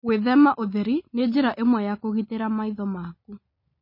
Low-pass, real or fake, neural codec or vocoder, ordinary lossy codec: 5.4 kHz; real; none; MP3, 24 kbps